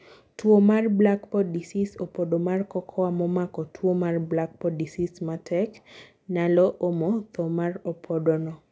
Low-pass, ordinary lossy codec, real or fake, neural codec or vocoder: none; none; real; none